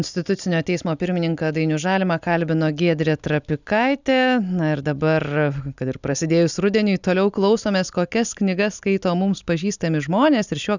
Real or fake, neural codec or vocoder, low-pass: real; none; 7.2 kHz